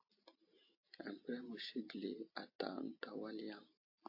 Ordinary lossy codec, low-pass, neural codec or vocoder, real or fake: AAC, 32 kbps; 5.4 kHz; none; real